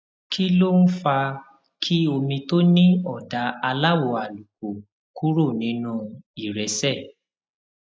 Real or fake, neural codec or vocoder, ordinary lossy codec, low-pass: real; none; none; none